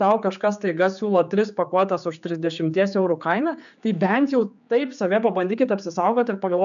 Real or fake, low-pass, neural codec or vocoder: fake; 7.2 kHz; codec, 16 kHz, 4 kbps, X-Codec, HuBERT features, trained on general audio